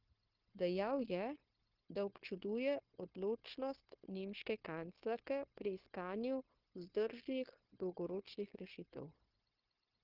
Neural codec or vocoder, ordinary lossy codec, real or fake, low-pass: codec, 16 kHz, 0.9 kbps, LongCat-Audio-Codec; Opus, 16 kbps; fake; 5.4 kHz